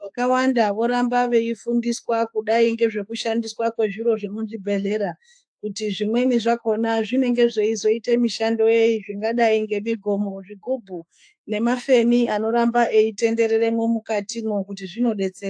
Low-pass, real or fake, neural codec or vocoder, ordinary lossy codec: 9.9 kHz; fake; autoencoder, 48 kHz, 32 numbers a frame, DAC-VAE, trained on Japanese speech; AAC, 64 kbps